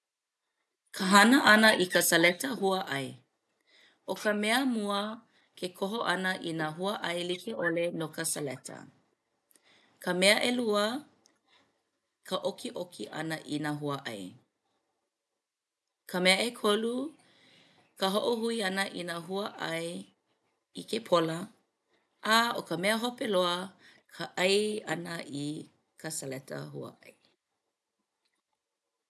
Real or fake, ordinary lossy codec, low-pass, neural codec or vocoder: real; none; none; none